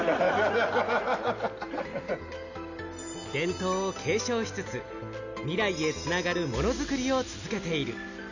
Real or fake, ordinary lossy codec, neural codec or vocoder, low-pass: real; none; none; 7.2 kHz